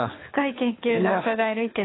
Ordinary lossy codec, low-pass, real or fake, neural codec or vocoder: AAC, 16 kbps; 7.2 kHz; fake; codec, 24 kHz, 6 kbps, HILCodec